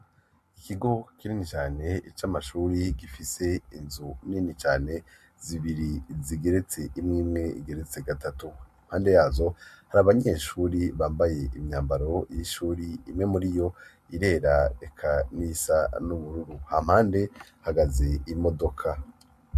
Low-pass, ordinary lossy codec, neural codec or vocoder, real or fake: 14.4 kHz; MP3, 64 kbps; none; real